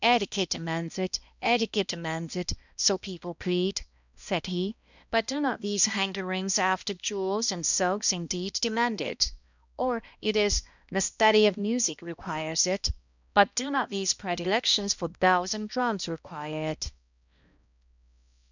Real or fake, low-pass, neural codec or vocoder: fake; 7.2 kHz; codec, 16 kHz, 1 kbps, X-Codec, HuBERT features, trained on balanced general audio